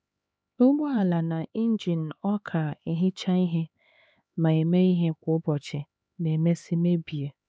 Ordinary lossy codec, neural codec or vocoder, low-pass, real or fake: none; codec, 16 kHz, 4 kbps, X-Codec, HuBERT features, trained on LibriSpeech; none; fake